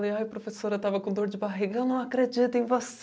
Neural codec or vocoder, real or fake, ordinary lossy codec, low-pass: none; real; none; none